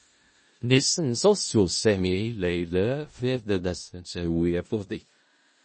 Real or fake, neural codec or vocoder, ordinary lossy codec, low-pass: fake; codec, 16 kHz in and 24 kHz out, 0.4 kbps, LongCat-Audio-Codec, four codebook decoder; MP3, 32 kbps; 9.9 kHz